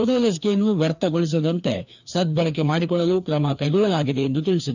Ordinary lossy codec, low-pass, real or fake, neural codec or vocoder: none; 7.2 kHz; fake; codec, 16 kHz, 2 kbps, FreqCodec, larger model